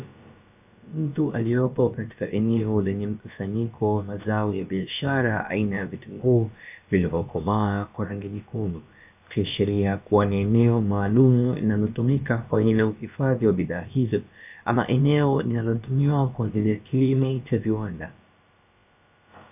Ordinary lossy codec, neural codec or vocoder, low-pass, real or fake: AAC, 32 kbps; codec, 16 kHz, about 1 kbps, DyCAST, with the encoder's durations; 3.6 kHz; fake